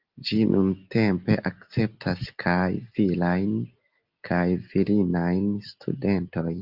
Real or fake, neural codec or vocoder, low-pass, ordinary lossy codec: real; none; 5.4 kHz; Opus, 24 kbps